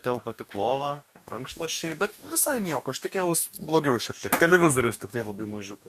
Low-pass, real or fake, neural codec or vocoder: 14.4 kHz; fake; codec, 44.1 kHz, 2.6 kbps, DAC